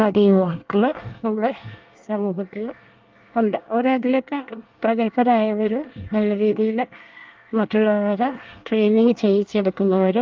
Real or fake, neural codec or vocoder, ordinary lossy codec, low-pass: fake; codec, 24 kHz, 1 kbps, SNAC; Opus, 32 kbps; 7.2 kHz